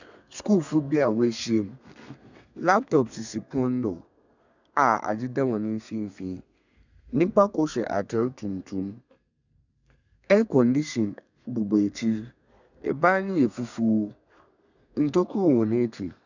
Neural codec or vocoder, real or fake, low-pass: codec, 32 kHz, 1.9 kbps, SNAC; fake; 7.2 kHz